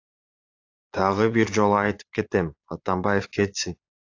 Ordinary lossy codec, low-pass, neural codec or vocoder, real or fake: AAC, 48 kbps; 7.2 kHz; vocoder, 22.05 kHz, 80 mel bands, Vocos; fake